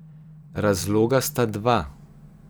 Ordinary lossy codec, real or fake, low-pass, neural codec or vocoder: none; fake; none; vocoder, 44.1 kHz, 128 mel bands every 256 samples, BigVGAN v2